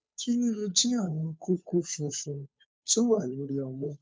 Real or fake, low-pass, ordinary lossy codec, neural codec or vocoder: fake; none; none; codec, 16 kHz, 2 kbps, FunCodec, trained on Chinese and English, 25 frames a second